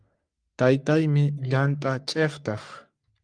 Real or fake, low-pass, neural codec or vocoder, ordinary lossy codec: fake; 9.9 kHz; codec, 44.1 kHz, 3.4 kbps, Pupu-Codec; Opus, 32 kbps